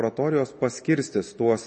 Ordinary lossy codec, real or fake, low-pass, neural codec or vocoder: MP3, 32 kbps; real; 10.8 kHz; none